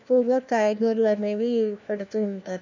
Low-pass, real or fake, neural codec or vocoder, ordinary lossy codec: 7.2 kHz; fake; codec, 16 kHz, 1 kbps, FunCodec, trained on LibriTTS, 50 frames a second; none